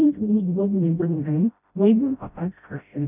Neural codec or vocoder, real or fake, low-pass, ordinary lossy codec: codec, 16 kHz, 0.5 kbps, FreqCodec, smaller model; fake; 3.6 kHz; none